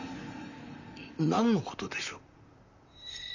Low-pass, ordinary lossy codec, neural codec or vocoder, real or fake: 7.2 kHz; none; vocoder, 44.1 kHz, 128 mel bands, Pupu-Vocoder; fake